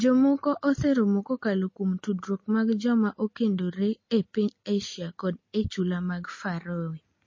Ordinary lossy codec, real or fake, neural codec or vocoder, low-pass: MP3, 32 kbps; real; none; 7.2 kHz